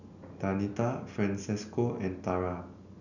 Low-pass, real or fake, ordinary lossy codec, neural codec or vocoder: 7.2 kHz; real; none; none